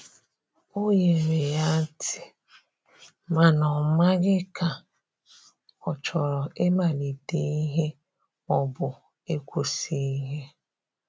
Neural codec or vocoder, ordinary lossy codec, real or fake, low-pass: none; none; real; none